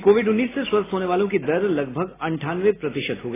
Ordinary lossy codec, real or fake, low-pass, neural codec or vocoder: AAC, 16 kbps; real; 3.6 kHz; none